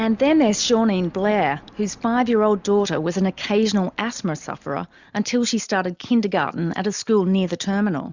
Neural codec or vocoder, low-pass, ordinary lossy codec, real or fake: none; 7.2 kHz; Opus, 64 kbps; real